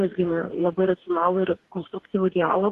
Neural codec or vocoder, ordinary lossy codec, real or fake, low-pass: codec, 44.1 kHz, 2.6 kbps, DAC; Opus, 16 kbps; fake; 14.4 kHz